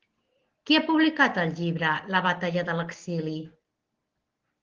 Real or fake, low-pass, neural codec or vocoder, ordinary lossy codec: real; 7.2 kHz; none; Opus, 16 kbps